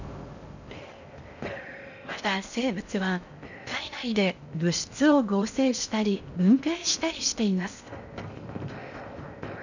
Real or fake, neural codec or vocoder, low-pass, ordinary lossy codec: fake; codec, 16 kHz in and 24 kHz out, 0.6 kbps, FocalCodec, streaming, 4096 codes; 7.2 kHz; none